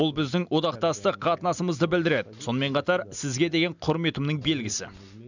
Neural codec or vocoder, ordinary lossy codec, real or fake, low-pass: none; none; real; 7.2 kHz